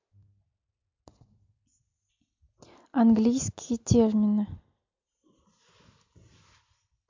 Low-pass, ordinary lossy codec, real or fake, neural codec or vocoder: 7.2 kHz; MP3, 48 kbps; real; none